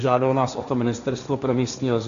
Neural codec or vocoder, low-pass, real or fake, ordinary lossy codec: codec, 16 kHz, 1.1 kbps, Voila-Tokenizer; 7.2 kHz; fake; MP3, 96 kbps